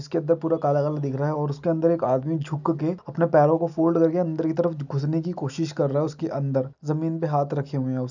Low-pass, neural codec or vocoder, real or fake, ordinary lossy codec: 7.2 kHz; none; real; none